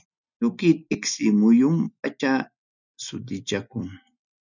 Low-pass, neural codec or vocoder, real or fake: 7.2 kHz; none; real